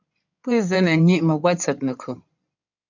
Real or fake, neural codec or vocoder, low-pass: fake; codec, 16 kHz in and 24 kHz out, 2.2 kbps, FireRedTTS-2 codec; 7.2 kHz